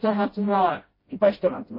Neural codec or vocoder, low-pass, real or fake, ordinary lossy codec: codec, 16 kHz, 0.5 kbps, FreqCodec, smaller model; 5.4 kHz; fake; MP3, 24 kbps